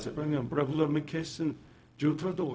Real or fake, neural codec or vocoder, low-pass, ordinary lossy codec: fake; codec, 16 kHz, 0.4 kbps, LongCat-Audio-Codec; none; none